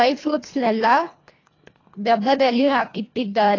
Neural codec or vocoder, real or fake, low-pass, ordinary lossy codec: codec, 24 kHz, 1.5 kbps, HILCodec; fake; 7.2 kHz; AAC, 32 kbps